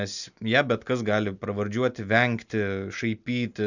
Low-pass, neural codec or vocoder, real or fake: 7.2 kHz; none; real